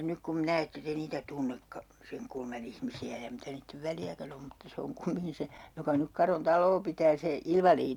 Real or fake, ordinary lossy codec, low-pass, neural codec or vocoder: real; none; 19.8 kHz; none